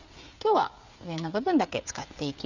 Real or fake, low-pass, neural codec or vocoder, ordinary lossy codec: fake; 7.2 kHz; codec, 16 kHz, 16 kbps, FreqCodec, smaller model; Opus, 64 kbps